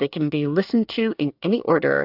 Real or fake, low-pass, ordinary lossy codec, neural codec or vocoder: fake; 5.4 kHz; AAC, 48 kbps; codec, 24 kHz, 1 kbps, SNAC